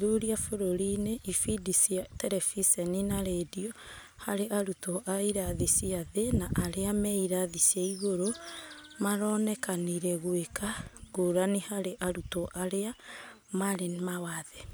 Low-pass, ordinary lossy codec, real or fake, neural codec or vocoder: none; none; real; none